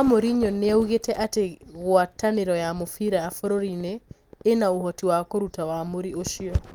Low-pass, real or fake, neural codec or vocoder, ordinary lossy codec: 19.8 kHz; real; none; Opus, 32 kbps